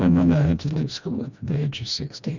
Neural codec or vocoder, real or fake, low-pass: codec, 16 kHz, 1 kbps, FreqCodec, smaller model; fake; 7.2 kHz